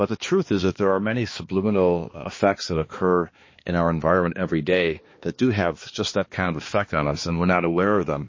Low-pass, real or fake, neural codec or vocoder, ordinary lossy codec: 7.2 kHz; fake; codec, 16 kHz, 2 kbps, X-Codec, HuBERT features, trained on balanced general audio; MP3, 32 kbps